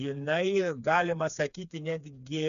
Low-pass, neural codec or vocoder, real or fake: 7.2 kHz; codec, 16 kHz, 4 kbps, FreqCodec, smaller model; fake